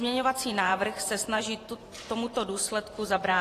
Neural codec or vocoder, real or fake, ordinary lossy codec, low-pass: vocoder, 44.1 kHz, 128 mel bands every 512 samples, BigVGAN v2; fake; AAC, 48 kbps; 14.4 kHz